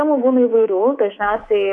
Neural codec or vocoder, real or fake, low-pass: codec, 24 kHz, 3.1 kbps, DualCodec; fake; 10.8 kHz